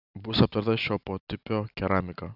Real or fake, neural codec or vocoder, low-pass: real; none; 5.4 kHz